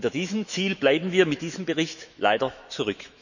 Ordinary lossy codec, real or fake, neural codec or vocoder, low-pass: none; fake; autoencoder, 48 kHz, 128 numbers a frame, DAC-VAE, trained on Japanese speech; 7.2 kHz